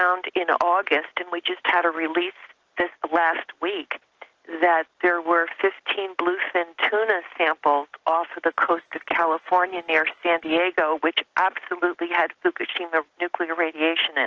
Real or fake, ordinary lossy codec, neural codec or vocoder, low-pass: real; Opus, 16 kbps; none; 7.2 kHz